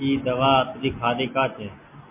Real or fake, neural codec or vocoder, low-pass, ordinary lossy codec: real; none; 3.6 kHz; MP3, 24 kbps